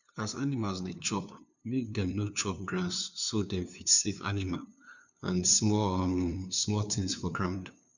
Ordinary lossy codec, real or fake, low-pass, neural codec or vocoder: none; fake; 7.2 kHz; codec, 16 kHz, 2 kbps, FunCodec, trained on LibriTTS, 25 frames a second